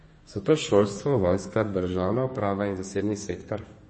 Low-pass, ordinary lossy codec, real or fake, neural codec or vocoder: 10.8 kHz; MP3, 32 kbps; fake; codec, 32 kHz, 1.9 kbps, SNAC